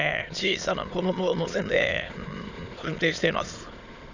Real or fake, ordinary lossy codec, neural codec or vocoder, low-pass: fake; Opus, 64 kbps; autoencoder, 22.05 kHz, a latent of 192 numbers a frame, VITS, trained on many speakers; 7.2 kHz